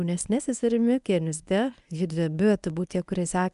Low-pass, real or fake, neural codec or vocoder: 10.8 kHz; fake; codec, 24 kHz, 0.9 kbps, WavTokenizer, medium speech release version 1